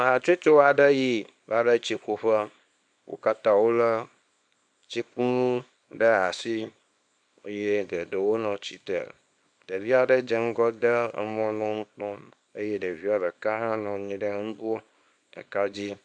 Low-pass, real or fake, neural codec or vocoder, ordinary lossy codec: 9.9 kHz; fake; codec, 24 kHz, 0.9 kbps, WavTokenizer, small release; MP3, 96 kbps